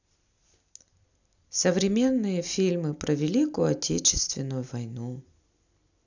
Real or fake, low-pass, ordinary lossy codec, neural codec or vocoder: real; 7.2 kHz; none; none